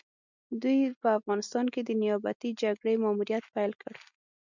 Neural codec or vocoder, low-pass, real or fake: none; 7.2 kHz; real